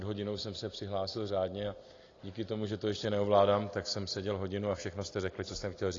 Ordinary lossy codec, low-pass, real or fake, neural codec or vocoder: AAC, 32 kbps; 7.2 kHz; real; none